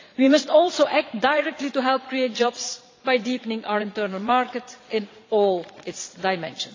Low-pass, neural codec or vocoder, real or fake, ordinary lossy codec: 7.2 kHz; vocoder, 22.05 kHz, 80 mel bands, Vocos; fake; AAC, 32 kbps